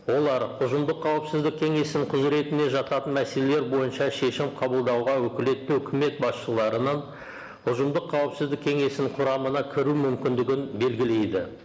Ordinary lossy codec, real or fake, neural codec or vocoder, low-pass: none; real; none; none